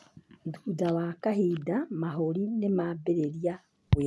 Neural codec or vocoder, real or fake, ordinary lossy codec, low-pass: none; real; none; none